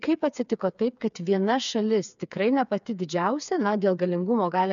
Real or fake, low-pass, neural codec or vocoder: fake; 7.2 kHz; codec, 16 kHz, 4 kbps, FreqCodec, smaller model